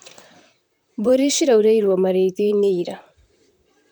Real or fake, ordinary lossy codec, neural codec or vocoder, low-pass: fake; none; vocoder, 44.1 kHz, 128 mel bands, Pupu-Vocoder; none